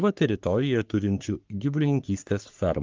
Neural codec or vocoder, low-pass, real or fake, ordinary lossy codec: codec, 44.1 kHz, 3.4 kbps, Pupu-Codec; 7.2 kHz; fake; Opus, 32 kbps